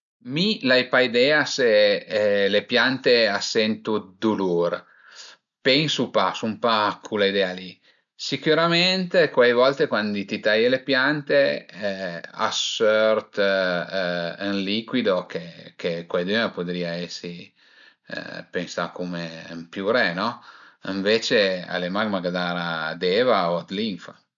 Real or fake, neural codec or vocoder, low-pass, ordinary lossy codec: real; none; 7.2 kHz; none